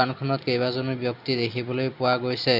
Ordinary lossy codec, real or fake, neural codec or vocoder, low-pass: none; real; none; 5.4 kHz